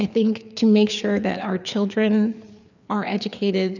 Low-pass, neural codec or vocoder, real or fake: 7.2 kHz; codec, 16 kHz, 4 kbps, FreqCodec, larger model; fake